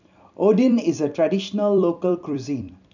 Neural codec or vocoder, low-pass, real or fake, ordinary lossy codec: vocoder, 44.1 kHz, 128 mel bands every 256 samples, BigVGAN v2; 7.2 kHz; fake; none